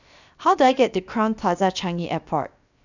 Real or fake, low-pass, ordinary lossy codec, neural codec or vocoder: fake; 7.2 kHz; none; codec, 16 kHz, 0.7 kbps, FocalCodec